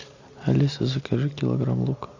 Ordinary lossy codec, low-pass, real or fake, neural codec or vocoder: Opus, 64 kbps; 7.2 kHz; real; none